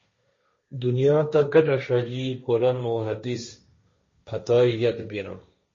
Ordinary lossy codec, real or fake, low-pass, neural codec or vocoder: MP3, 32 kbps; fake; 7.2 kHz; codec, 16 kHz, 1.1 kbps, Voila-Tokenizer